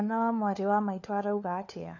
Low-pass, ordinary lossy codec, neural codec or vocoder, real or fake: 7.2 kHz; none; codec, 16 kHz, 4 kbps, FunCodec, trained on LibriTTS, 50 frames a second; fake